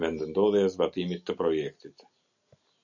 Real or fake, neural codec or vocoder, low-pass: real; none; 7.2 kHz